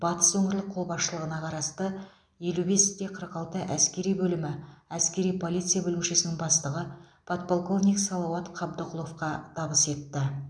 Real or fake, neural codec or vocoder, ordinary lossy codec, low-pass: real; none; none; 9.9 kHz